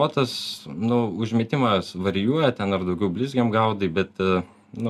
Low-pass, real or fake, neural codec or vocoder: 14.4 kHz; real; none